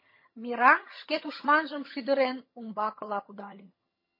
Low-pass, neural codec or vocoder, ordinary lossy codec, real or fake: 5.4 kHz; vocoder, 22.05 kHz, 80 mel bands, HiFi-GAN; MP3, 24 kbps; fake